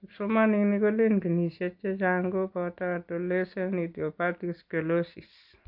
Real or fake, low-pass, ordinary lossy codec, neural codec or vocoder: real; 5.4 kHz; AAC, 48 kbps; none